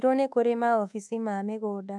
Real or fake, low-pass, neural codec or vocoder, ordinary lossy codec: fake; none; codec, 24 kHz, 1.2 kbps, DualCodec; none